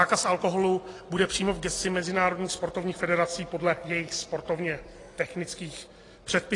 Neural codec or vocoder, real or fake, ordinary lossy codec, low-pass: none; real; AAC, 32 kbps; 10.8 kHz